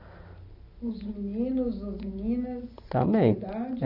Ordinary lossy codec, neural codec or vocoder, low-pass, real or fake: none; none; 5.4 kHz; real